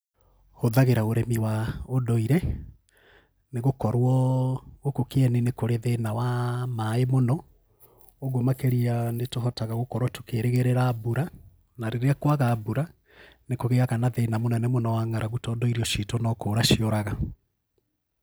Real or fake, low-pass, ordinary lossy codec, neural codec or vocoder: real; none; none; none